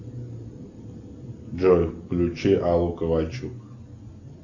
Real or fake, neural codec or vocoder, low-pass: real; none; 7.2 kHz